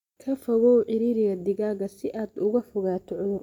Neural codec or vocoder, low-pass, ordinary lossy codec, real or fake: none; 19.8 kHz; MP3, 96 kbps; real